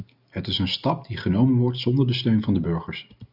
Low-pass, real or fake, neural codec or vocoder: 5.4 kHz; real; none